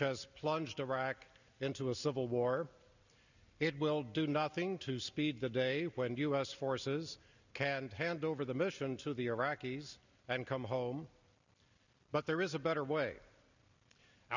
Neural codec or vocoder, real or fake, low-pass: none; real; 7.2 kHz